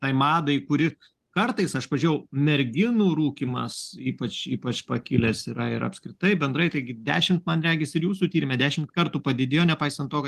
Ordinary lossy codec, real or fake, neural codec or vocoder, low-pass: Opus, 24 kbps; real; none; 14.4 kHz